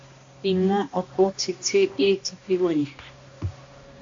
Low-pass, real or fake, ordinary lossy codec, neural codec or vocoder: 7.2 kHz; fake; AAC, 48 kbps; codec, 16 kHz, 1 kbps, X-Codec, HuBERT features, trained on balanced general audio